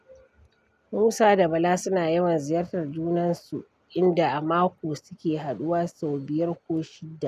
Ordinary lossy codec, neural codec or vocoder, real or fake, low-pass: none; none; real; 14.4 kHz